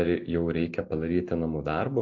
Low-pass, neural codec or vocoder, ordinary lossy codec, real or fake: 7.2 kHz; none; AAC, 48 kbps; real